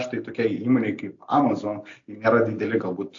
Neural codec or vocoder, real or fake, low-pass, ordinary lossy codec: none; real; 7.2 kHz; AAC, 48 kbps